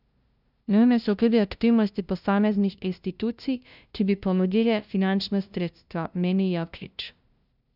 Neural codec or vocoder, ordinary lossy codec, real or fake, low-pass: codec, 16 kHz, 0.5 kbps, FunCodec, trained on LibriTTS, 25 frames a second; none; fake; 5.4 kHz